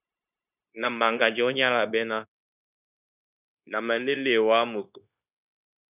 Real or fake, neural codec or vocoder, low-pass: fake; codec, 16 kHz, 0.9 kbps, LongCat-Audio-Codec; 3.6 kHz